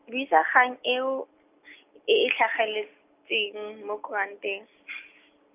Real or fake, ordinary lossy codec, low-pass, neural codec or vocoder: real; none; 3.6 kHz; none